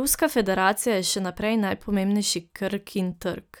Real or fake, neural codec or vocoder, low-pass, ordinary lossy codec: real; none; none; none